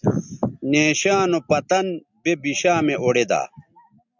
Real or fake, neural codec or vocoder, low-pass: real; none; 7.2 kHz